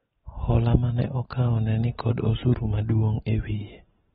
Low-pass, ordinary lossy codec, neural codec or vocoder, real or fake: 19.8 kHz; AAC, 16 kbps; none; real